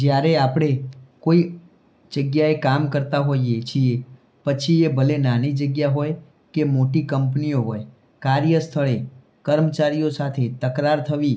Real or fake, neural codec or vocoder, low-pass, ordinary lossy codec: real; none; none; none